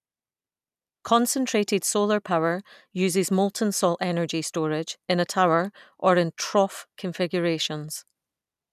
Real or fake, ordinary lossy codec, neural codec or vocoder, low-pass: real; none; none; 14.4 kHz